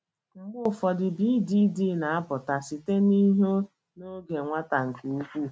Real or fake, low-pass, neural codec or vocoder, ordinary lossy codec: real; none; none; none